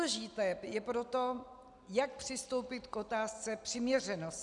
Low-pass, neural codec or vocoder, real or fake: 10.8 kHz; none; real